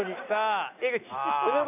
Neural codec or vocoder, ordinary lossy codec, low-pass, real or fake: none; none; 3.6 kHz; real